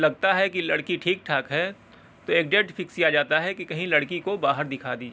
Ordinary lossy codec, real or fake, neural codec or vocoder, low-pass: none; real; none; none